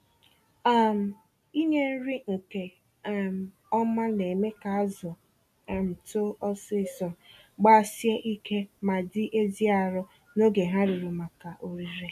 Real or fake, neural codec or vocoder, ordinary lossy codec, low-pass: real; none; AAC, 96 kbps; 14.4 kHz